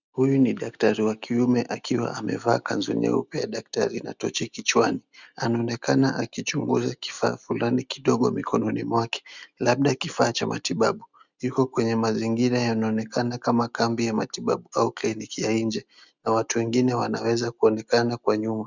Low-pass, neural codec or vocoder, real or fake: 7.2 kHz; none; real